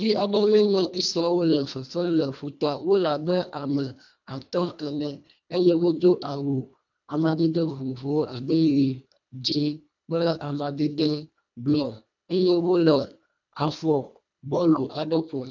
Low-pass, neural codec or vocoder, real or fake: 7.2 kHz; codec, 24 kHz, 1.5 kbps, HILCodec; fake